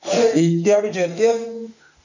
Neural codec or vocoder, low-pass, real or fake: autoencoder, 48 kHz, 32 numbers a frame, DAC-VAE, trained on Japanese speech; 7.2 kHz; fake